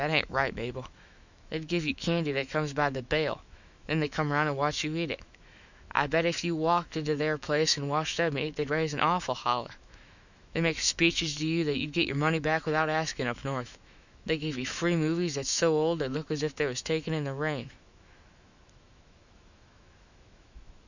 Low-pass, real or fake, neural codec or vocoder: 7.2 kHz; fake; autoencoder, 48 kHz, 128 numbers a frame, DAC-VAE, trained on Japanese speech